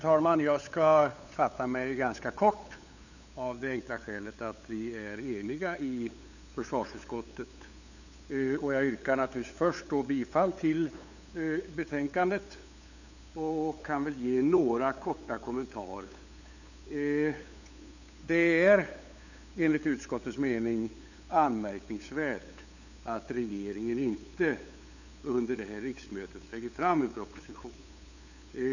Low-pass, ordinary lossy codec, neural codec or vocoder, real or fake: 7.2 kHz; none; codec, 16 kHz, 8 kbps, FunCodec, trained on LibriTTS, 25 frames a second; fake